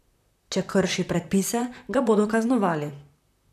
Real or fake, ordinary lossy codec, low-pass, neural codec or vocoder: fake; none; 14.4 kHz; vocoder, 44.1 kHz, 128 mel bands, Pupu-Vocoder